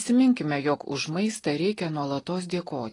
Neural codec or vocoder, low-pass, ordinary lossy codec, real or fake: none; 10.8 kHz; AAC, 32 kbps; real